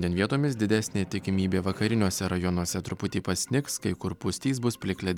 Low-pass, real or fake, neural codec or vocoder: 19.8 kHz; real; none